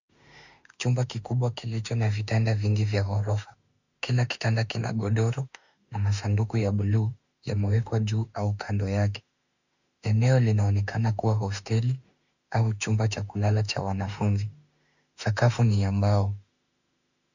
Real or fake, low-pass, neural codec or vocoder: fake; 7.2 kHz; autoencoder, 48 kHz, 32 numbers a frame, DAC-VAE, trained on Japanese speech